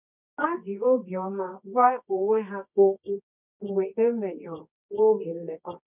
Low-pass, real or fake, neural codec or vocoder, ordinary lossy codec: 3.6 kHz; fake; codec, 24 kHz, 0.9 kbps, WavTokenizer, medium music audio release; none